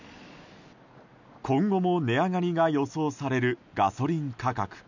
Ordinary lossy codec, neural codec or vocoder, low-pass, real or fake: none; none; 7.2 kHz; real